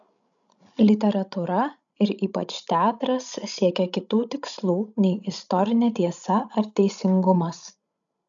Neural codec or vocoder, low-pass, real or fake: codec, 16 kHz, 16 kbps, FreqCodec, larger model; 7.2 kHz; fake